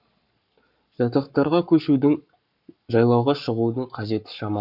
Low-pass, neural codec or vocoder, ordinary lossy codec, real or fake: 5.4 kHz; codec, 44.1 kHz, 7.8 kbps, Pupu-Codec; none; fake